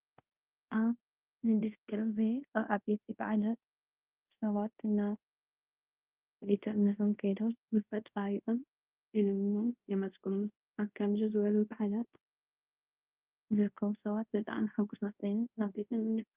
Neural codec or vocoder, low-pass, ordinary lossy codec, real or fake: codec, 24 kHz, 0.5 kbps, DualCodec; 3.6 kHz; Opus, 24 kbps; fake